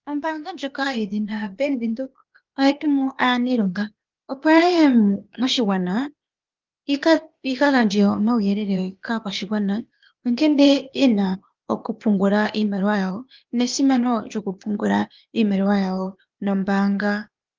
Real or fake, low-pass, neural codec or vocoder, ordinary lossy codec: fake; 7.2 kHz; codec, 16 kHz, 0.8 kbps, ZipCodec; Opus, 32 kbps